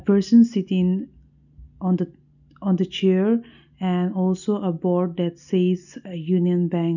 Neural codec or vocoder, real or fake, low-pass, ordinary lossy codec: none; real; 7.2 kHz; none